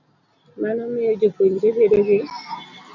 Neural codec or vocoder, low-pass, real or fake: none; 7.2 kHz; real